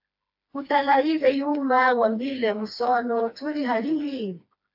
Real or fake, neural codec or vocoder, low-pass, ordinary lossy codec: fake; codec, 16 kHz, 2 kbps, FreqCodec, smaller model; 5.4 kHz; AAC, 48 kbps